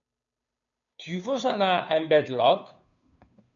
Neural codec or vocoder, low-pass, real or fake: codec, 16 kHz, 2 kbps, FunCodec, trained on Chinese and English, 25 frames a second; 7.2 kHz; fake